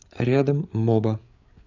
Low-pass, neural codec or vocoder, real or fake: 7.2 kHz; codec, 16 kHz, 8 kbps, FreqCodec, larger model; fake